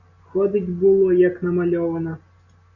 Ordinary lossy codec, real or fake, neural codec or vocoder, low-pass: Opus, 64 kbps; real; none; 7.2 kHz